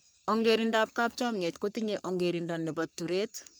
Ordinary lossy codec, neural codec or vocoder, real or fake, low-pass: none; codec, 44.1 kHz, 3.4 kbps, Pupu-Codec; fake; none